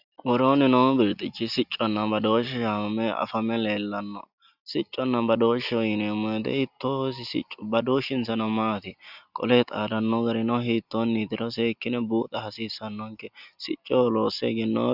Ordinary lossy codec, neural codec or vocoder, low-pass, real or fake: Opus, 64 kbps; none; 5.4 kHz; real